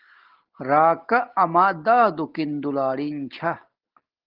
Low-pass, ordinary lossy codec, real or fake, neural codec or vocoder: 5.4 kHz; Opus, 16 kbps; real; none